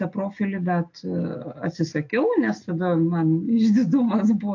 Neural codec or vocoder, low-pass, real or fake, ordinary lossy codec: none; 7.2 kHz; real; AAC, 48 kbps